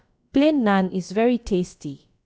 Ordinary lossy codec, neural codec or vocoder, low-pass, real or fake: none; codec, 16 kHz, about 1 kbps, DyCAST, with the encoder's durations; none; fake